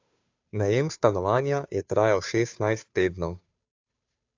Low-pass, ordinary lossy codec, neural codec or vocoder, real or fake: 7.2 kHz; AAC, 48 kbps; codec, 16 kHz, 2 kbps, FunCodec, trained on Chinese and English, 25 frames a second; fake